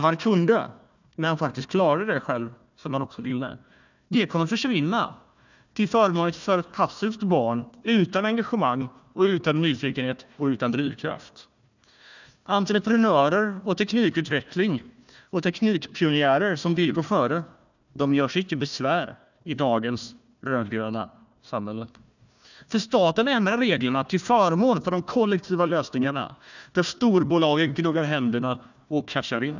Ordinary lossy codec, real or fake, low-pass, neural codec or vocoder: none; fake; 7.2 kHz; codec, 16 kHz, 1 kbps, FunCodec, trained on Chinese and English, 50 frames a second